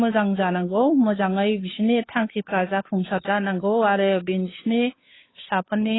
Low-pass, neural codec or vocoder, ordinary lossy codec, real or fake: 7.2 kHz; codec, 16 kHz, 2 kbps, FunCodec, trained on Chinese and English, 25 frames a second; AAC, 16 kbps; fake